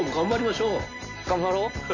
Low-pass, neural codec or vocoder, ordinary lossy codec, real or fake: 7.2 kHz; none; none; real